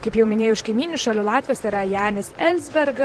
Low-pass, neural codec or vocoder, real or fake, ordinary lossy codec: 10.8 kHz; vocoder, 44.1 kHz, 128 mel bands, Pupu-Vocoder; fake; Opus, 16 kbps